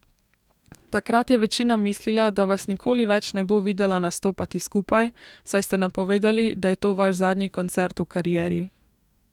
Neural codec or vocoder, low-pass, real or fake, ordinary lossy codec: codec, 44.1 kHz, 2.6 kbps, DAC; 19.8 kHz; fake; none